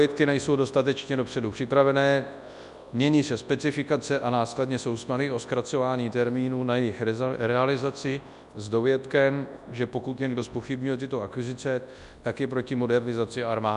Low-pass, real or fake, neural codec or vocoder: 10.8 kHz; fake; codec, 24 kHz, 0.9 kbps, WavTokenizer, large speech release